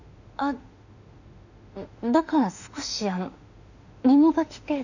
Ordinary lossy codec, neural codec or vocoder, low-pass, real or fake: none; autoencoder, 48 kHz, 32 numbers a frame, DAC-VAE, trained on Japanese speech; 7.2 kHz; fake